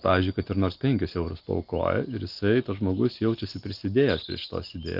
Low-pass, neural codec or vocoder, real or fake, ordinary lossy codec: 5.4 kHz; none; real; Opus, 24 kbps